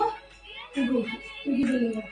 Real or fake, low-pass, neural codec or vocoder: real; 10.8 kHz; none